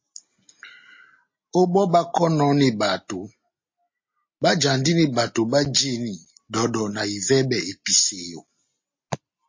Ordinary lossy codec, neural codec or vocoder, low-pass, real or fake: MP3, 32 kbps; none; 7.2 kHz; real